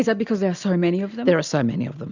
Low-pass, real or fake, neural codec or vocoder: 7.2 kHz; real; none